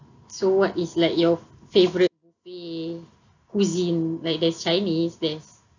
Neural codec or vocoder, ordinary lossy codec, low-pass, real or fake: none; none; 7.2 kHz; real